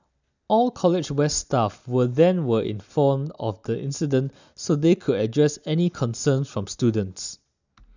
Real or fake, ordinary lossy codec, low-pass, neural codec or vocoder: real; none; 7.2 kHz; none